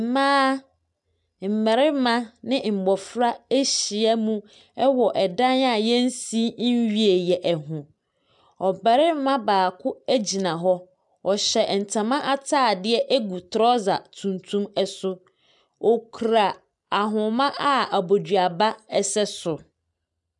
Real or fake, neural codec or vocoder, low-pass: real; none; 10.8 kHz